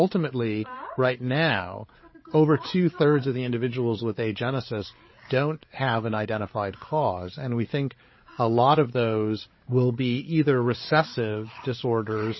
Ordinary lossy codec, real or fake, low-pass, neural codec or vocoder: MP3, 24 kbps; fake; 7.2 kHz; codec, 16 kHz, 8 kbps, FreqCodec, larger model